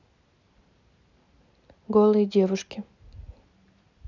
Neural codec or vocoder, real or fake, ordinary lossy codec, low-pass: none; real; none; 7.2 kHz